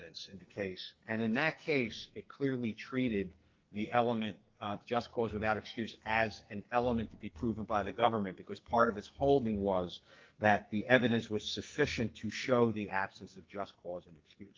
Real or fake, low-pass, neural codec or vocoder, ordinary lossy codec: fake; 7.2 kHz; codec, 44.1 kHz, 2.6 kbps, SNAC; Opus, 32 kbps